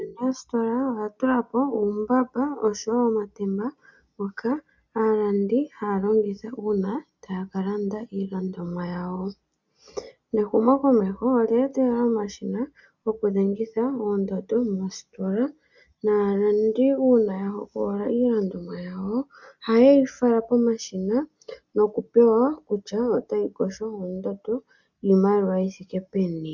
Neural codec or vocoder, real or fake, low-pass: none; real; 7.2 kHz